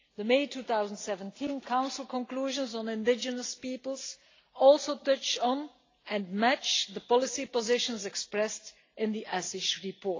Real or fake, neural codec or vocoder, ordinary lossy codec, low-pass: real; none; AAC, 32 kbps; 7.2 kHz